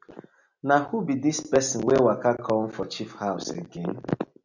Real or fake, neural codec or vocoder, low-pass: real; none; 7.2 kHz